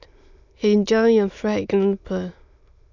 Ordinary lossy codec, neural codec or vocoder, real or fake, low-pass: none; autoencoder, 22.05 kHz, a latent of 192 numbers a frame, VITS, trained on many speakers; fake; 7.2 kHz